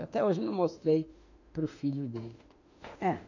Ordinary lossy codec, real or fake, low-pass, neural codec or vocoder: none; fake; 7.2 kHz; autoencoder, 48 kHz, 32 numbers a frame, DAC-VAE, trained on Japanese speech